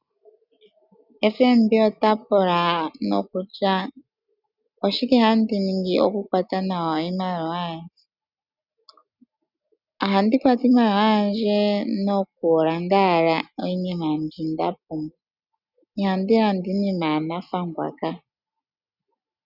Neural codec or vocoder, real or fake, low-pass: none; real; 5.4 kHz